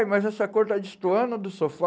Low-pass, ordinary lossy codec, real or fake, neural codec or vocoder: none; none; real; none